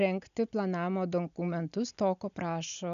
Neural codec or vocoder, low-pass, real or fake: none; 7.2 kHz; real